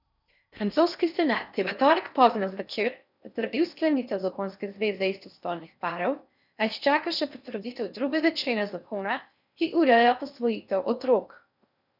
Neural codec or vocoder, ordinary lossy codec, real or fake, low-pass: codec, 16 kHz in and 24 kHz out, 0.6 kbps, FocalCodec, streaming, 4096 codes; none; fake; 5.4 kHz